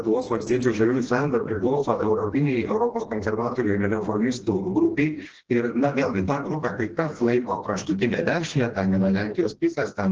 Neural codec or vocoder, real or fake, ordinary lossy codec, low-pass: codec, 16 kHz, 1 kbps, FreqCodec, smaller model; fake; Opus, 16 kbps; 7.2 kHz